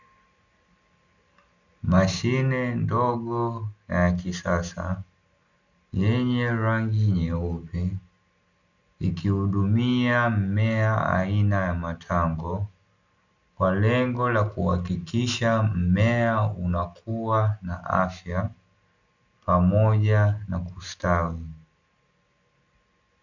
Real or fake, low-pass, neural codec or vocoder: real; 7.2 kHz; none